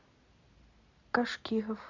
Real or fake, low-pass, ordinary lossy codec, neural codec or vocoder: real; 7.2 kHz; MP3, 64 kbps; none